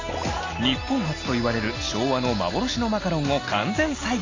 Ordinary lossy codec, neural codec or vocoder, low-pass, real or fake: AAC, 32 kbps; none; 7.2 kHz; real